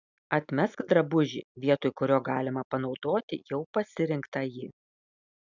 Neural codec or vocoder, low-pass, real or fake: none; 7.2 kHz; real